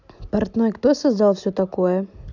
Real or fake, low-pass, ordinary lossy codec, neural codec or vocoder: real; 7.2 kHz; none; none